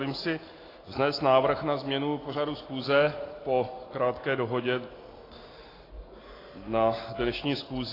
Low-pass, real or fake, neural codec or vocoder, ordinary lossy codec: 5.4 kHz; real; none; AAC, 24 kbps